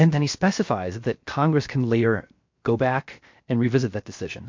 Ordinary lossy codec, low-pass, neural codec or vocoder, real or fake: MP3, 48 kbps; 7.2 kHz; codec, 16 kHz, 0.7 kbps, FocalCodec; fake